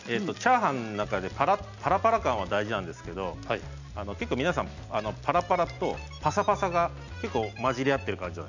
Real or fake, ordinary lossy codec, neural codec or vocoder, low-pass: real; none; none; 7.2 kHz